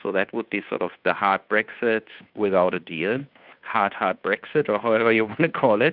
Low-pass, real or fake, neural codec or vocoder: 5.4 kHz; fake; codec, 16 kHz, 2 kbps, FunCodec, trained on Chinese and English, 25 frames a second